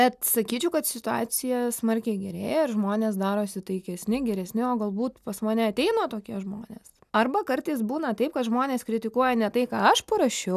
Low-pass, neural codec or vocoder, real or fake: 14.4 kHz; none; real